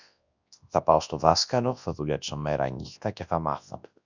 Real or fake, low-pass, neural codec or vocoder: fake; 7.2 kHz; codec, 24 kHz, 0.9 kbps, WavTokenizer, large speech release